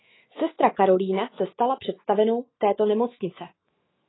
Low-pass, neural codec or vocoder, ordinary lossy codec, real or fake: 7.2 kHz; autoencoder, 48 kHz, 128 numbers a frame, DAC-VAE, trained on Japanese speech; AAC, 16 kbps; fake